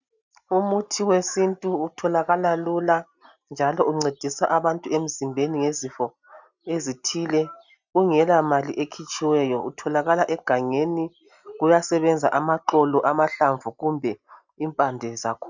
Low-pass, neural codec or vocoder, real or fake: 7.2 kHz; none; real